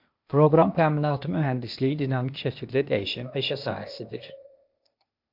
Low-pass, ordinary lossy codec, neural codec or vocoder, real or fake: 5.4 kHz; MP3, 48 kbps; codec, 16 kHz, 0.8 kbps, ZipCodec; fake